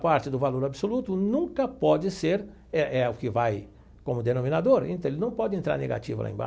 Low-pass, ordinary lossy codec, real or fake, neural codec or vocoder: none; none; real; none